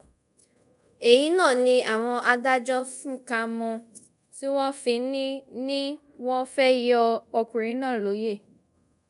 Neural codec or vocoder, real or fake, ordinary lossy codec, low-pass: codec, 24 kHz, 0.5 kbps, DualCodec; fake; none; 10.8 kHz